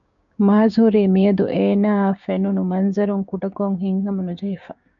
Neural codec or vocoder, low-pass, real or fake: codec, 16 kHz, 6 kbps, DAC; 7.2 kHz; fake